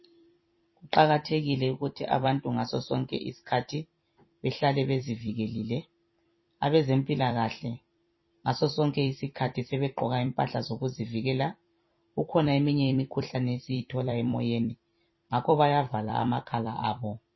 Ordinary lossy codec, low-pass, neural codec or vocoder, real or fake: MP3, 24 kbps; 7.2 kHz; vocoder, 44.1 kHz, 128 mel bands every 256 samples, BigVGAN v2; fake